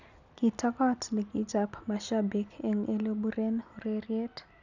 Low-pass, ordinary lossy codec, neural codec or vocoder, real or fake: 7.2 kHz; none; none; real